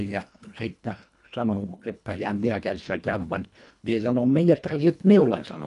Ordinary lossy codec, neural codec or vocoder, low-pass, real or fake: none; codec, 24 kHz, 1.5 kbps, HILCodec; 10.8 kHz; fake